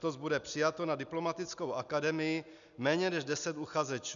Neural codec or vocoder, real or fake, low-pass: none; real; 7.2 kHz